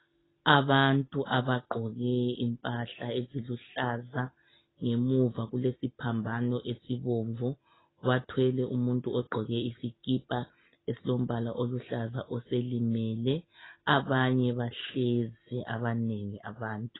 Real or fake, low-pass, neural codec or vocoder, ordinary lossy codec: real; 7.2 kHz; none; AAC, 16 kbps